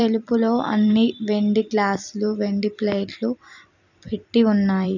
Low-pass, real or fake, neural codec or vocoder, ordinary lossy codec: 7.2 kHz; fake; vocoder, 44.1 kHz, 128 mel bands every 256 samples, BigVGAN v2; none